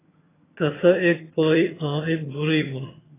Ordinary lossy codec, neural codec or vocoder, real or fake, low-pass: AAC, 16 kbps; vocoder, 22.05 kHz, 80 mel bands, HiFi-GAN; fake; 3.6 kHz